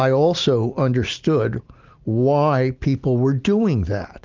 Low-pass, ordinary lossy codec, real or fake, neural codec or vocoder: 7.2 kHz; Opus, 32 kbps; real; none